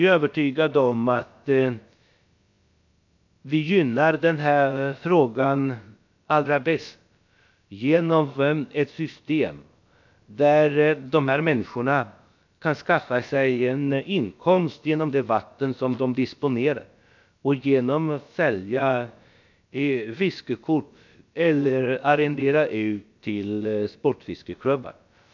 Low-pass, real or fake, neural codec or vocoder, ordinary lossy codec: 7.2 kHz; fake; codec, 16 kHz, about 1 kbps, DyCAST, with the encoder's durations; MP3, 64 kbps